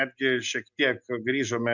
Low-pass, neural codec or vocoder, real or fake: 7.2 kHz; none; real